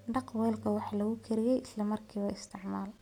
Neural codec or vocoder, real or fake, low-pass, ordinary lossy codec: none; real; 19.8 kHz; none